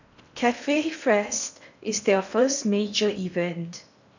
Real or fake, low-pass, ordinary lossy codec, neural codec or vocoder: fake; 7.2 kHz; none; codec, 16 kHz in and 24 kHz out, 0.8 kbps, FocalCodec, streaming, 65536 codes